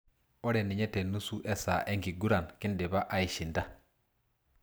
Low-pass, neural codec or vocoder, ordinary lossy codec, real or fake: none; none; none; real